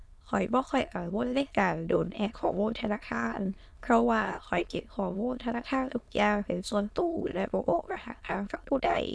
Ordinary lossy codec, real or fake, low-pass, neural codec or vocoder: none; fake; none; autoencoder, 22.05 kHz, a latent of 192 numbers a frame, VITS, trained on many speakers